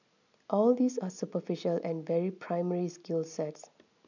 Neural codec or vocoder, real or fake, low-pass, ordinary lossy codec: none; real; 7.2 kHz; none